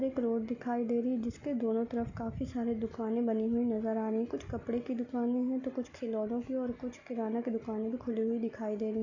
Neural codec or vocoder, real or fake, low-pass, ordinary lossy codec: none; real; 7.2 kHz; none